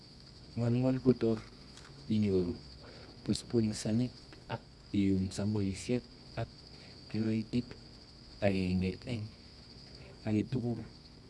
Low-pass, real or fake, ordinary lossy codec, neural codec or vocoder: none; fake; none; codec, 24 kHz, 0.9 kbps, WavTokenizer, medium music audio release